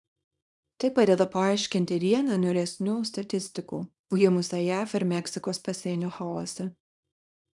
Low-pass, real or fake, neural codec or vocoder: 10.8 kHz; fake; codec, 24 kHz, 0.9 kbps, WavTokenizer, small release